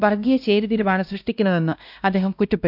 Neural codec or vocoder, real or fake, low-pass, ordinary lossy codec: codec, 16 kHz, 1 kbps, X-Codec, WavLM features, trained on Multilingual LibriSpeech; fake; 5.4 kHz; none